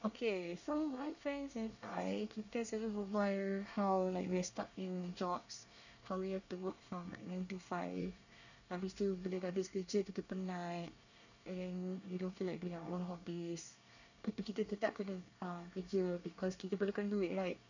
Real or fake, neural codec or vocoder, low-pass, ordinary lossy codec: fake; codec, 24 kHz, 1 kbps, SNAC; 7.2 kHz; none